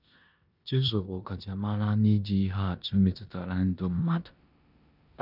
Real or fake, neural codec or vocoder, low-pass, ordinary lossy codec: fake; codec, 16 kHz in and 24 kHz out, 0.9 kbps, LongCat-Audio-Codec, four codebook decoder; 5.4 kHz; none